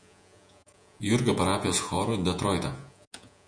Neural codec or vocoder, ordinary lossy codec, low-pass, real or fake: vocoder, 48 kHz, 128 mel bands, Vocos; MP3, 96 kbps; 9.9 kHz; fake